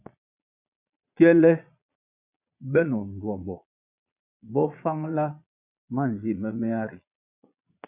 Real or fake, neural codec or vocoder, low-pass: fake; vocoder, 22.05 kHz, 80 mel bands, Vocos; 3.6 kHz